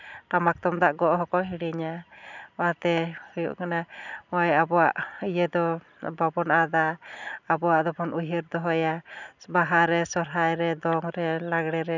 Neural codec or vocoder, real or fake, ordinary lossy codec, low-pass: none; real; none; 7.2 kHz